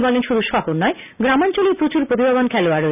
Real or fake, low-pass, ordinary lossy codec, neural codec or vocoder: real; 3.6 kHz; none; none